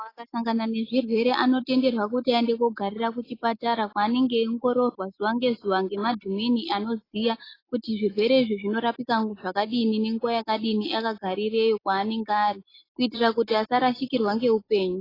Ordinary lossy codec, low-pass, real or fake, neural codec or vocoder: AAC, 24 kbps; 5.4 kHz; real; none